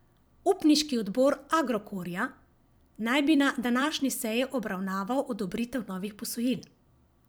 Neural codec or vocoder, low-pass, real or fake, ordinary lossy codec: vocoder, 44.1 kHz, 128 mel bands every 256 samples, BigVGAN v2; none; fake; none